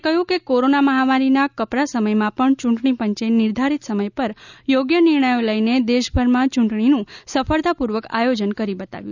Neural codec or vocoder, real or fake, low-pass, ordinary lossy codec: none; real; 7.2 kHz; none